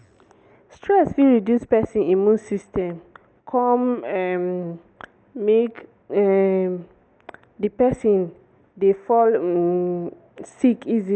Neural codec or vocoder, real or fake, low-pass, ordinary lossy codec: none; real; none; none